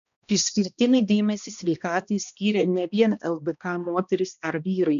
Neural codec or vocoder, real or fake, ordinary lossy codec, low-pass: codec, 16 kHz, 1 kbps, X-Codec, HuBERT features, trained on balanced general audio; fake; MP3, 96 kbps; 7.2 kHz